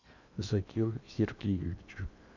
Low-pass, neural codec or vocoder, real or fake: 7.2 kHz; codec, 16 kHz in and 24 kHz out, 0.8 kbps, FocalCodec, streaming, 65536 codes; fake